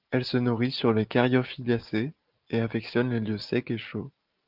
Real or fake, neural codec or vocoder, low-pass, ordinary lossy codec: real; none; 5.4 kHz; Opus, 24 kbps